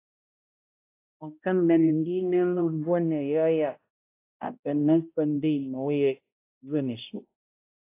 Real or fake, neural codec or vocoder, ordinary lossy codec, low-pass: fake; codec, 16 kHz, 0.5 kbps, X-Codec, HuBERT features, trained on balanced general audio; AAC, 24 kbps; 3.6 kHz